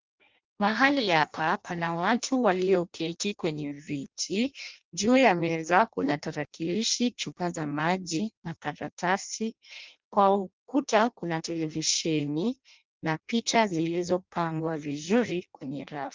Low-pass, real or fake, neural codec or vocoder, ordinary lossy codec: 7.2 kHz; fake; codec, 16 kHz in and 24 kHz out, 0.6 kbps, FireRedTTS-2 codec; Opus, 24 kbps